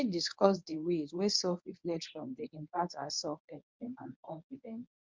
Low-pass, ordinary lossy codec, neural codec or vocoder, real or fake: 7.2 kHz; MP3, 64 kbps; codec, 24 kHz, 0.9 kbps, WavTokenizer, medium speech release version 1; fake